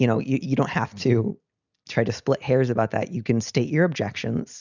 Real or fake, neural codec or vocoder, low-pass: real; none; 7.2 kHz